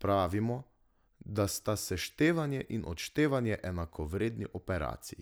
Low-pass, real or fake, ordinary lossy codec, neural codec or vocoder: none; real; none; none